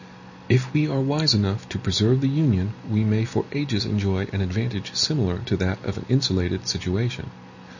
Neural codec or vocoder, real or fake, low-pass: none; real; 7.2 kHz